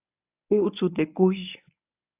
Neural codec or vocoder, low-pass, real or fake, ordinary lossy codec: codec, 24 kHz, 0.9 kbps, WavTokenizer, medium speech release version 1; 3.6 kHz; fake; AAC, 32 kbps